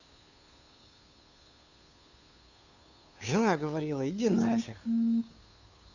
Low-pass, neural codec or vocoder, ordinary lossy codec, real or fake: 7.2 kHz; codec, 16 kHz, 2 kbps, FunCodec, trained on Chinese and English, 25 frames a second; none; fake